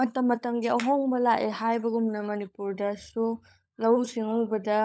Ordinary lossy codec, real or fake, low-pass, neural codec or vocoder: none; fake; none; codec, 16 kHz, 16 kbps, FunCodec, trained on LibriTTS, 50 frames a second